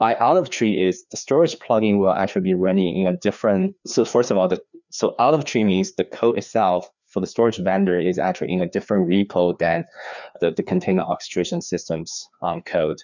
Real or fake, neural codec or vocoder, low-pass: fake; codec, 16 kHz, 2 kbps, FreqCodec, larger model; 7.2 kHz